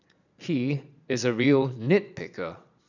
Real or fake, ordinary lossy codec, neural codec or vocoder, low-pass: fake; none; vocoder, 22.05 kHz, 80 mel bands, Vocos; 7.2 kHz